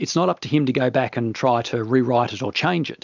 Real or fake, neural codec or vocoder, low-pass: real; none; 7.2 kHz